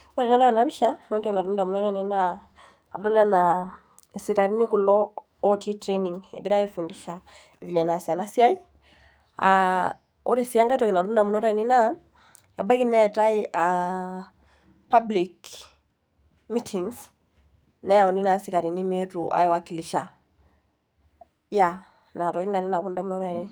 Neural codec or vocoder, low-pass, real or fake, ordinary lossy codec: codec, 44.1 kHz, 2.6 kbps, SNAC; none; fake; none